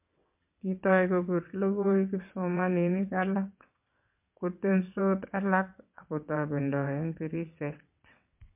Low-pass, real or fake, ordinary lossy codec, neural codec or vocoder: 3.6 kHz; fake; MP3, 32 kbps; vocoder, 22.05 kHz, 80 mel bands, WaveNeXt